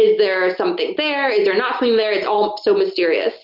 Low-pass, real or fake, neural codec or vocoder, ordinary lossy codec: 5.4 kHz; real; none; Opus, 24 kbps